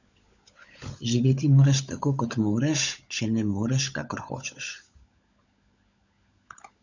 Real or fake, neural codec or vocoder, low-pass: fake; codec, 16 kHz, 16 kbps, FunCodec, trained on LibriTTS, 50 frames a second; 7.2 kHz